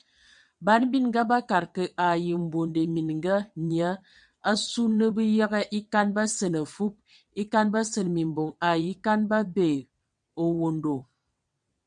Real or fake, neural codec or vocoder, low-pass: fake; vocoder, 22.05 kHz, 80 mel bands, WaveNeXt; 9.9 kHz